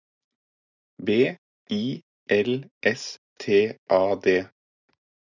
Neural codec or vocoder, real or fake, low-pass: none; real; 7.2 kHz